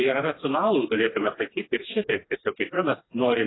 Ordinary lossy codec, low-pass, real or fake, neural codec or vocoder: AAC, 16 kbps; 7.2 kHz; fake; codec, 16 kHz, 2 kbps, FreqCodec, smaller model